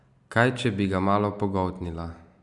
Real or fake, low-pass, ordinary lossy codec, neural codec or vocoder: real; 10.8 kHz; none; none